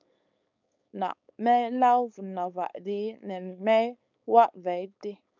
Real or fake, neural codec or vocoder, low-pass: fake; codec, 16 kHz, 4.8 kbps, FACodec; 7.2 kHz